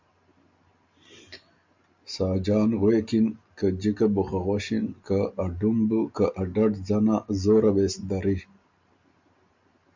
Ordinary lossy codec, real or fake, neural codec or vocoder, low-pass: MP3, 64 kbps; real; none; 7.2 kHz